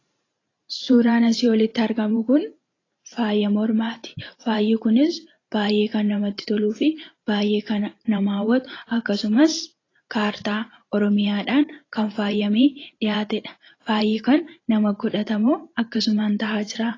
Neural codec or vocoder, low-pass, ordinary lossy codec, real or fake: vocoder, 44.1 kHz, 128 mel bands every 512 samples, BigVGAN v2; 7.2 kHz; AAC, 32 kbps; fake